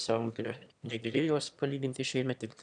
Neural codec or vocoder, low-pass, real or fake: autoencoder, 22.05 kHz, a latent of 192 numbers a frame, VITS, trained on one speaker; 9.9 kHz; fake